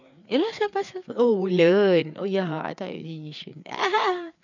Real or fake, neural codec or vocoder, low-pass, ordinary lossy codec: fake; codec, 16 kHz, 4 kbps, FreqCodec, larger model; 7.2 kHz; none